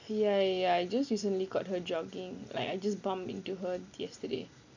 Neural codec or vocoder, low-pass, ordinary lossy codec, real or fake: none; 7.2 kHz; none; real